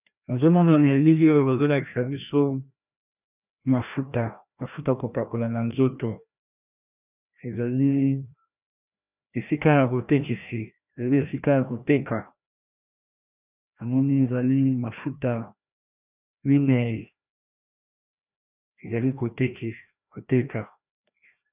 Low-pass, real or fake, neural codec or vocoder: 3.6 kHz; fake; codec, 16 kHz, 1 kbps, FreqCodec, larger model